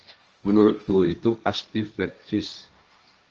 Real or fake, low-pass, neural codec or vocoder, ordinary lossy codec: fake; 7.2 kHz; codec, 16 kHz, 1.1 kbps, Voila-Tokenizer; Opus, 24 kbps